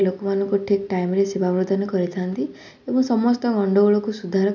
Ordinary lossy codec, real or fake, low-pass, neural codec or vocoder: none; real; 7.2 kHz; none